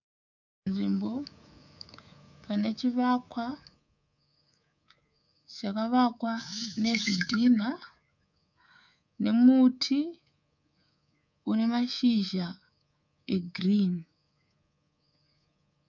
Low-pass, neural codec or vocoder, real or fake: 7.2 kHz; codec, 24 kHz, 3.1 kbps, DualCodec; fake